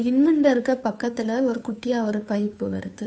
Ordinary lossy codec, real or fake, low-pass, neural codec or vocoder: none; fake; none; codec, 16 kHz, 2 kbps, FunCodec, trained on Chinese and English, 25 frames a second